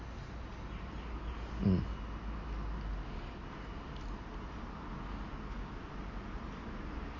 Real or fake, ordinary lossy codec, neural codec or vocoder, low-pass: real; MP3, 32 kbps; none; 7.2 kHz